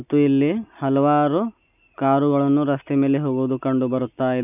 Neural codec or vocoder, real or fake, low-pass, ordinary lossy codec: none; real; 3.6 kHz; none